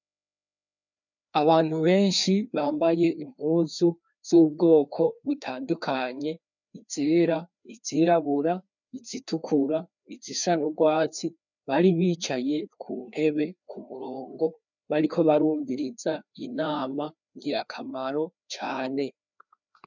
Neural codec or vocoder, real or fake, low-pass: codec, 16 kHz, 2 kbps, FreqCodec, larger model; fake; 7.2 kHz